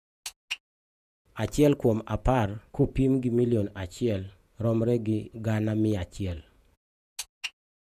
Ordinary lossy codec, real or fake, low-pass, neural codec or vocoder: none; fake; 14.4 kHz; vocoder, 48 kHz, 128 mel bands, Vocos